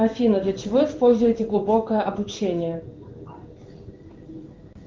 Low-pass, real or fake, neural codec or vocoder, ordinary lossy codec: 7.2 kHz; fake; codec, 16 kHz in and 24 kHz out, 1 kbps, XY-Tokenizer; Opus, 24 kbps